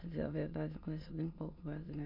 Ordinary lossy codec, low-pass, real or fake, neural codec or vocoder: MP3, 48 kbps; 5.4 kHz; fake; autoencoder, 22.05 kHz, a latent of 192 numbers a frame, VITS, trained on many speakers